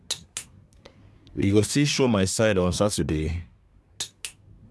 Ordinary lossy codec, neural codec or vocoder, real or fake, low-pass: none; codec, 24 kHz, 1 kbps, SNAC; fake; none